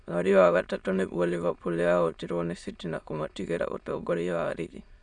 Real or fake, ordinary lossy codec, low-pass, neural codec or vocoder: fake; none; 9.9 kHz; autoencoder, 22.05 kHz, a latent of 192 numbers a frame, VITS, trained on many speakers